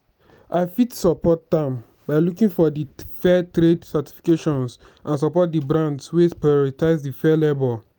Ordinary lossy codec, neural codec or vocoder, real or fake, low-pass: none; none; real; none